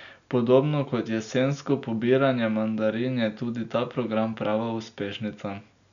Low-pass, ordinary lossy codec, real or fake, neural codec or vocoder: 7.2 kHz; none; real; none